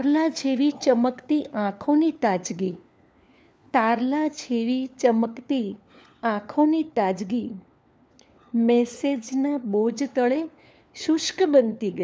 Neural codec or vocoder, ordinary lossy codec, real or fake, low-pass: codec, 16 kHz, 4 kbps, FunCodec, trained on LibriTTS, 50 frames a second; none; fake; none